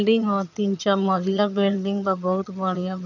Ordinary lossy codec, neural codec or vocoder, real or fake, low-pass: none; vocoder, 22.05 kHz, 80 mel bands, HiFi-GAN; fake; 7.2 kHz